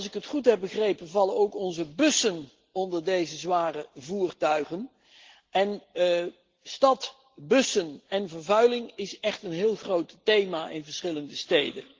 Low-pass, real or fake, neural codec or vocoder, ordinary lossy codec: 7.2 kHz; real; none; Opus, 16 kbps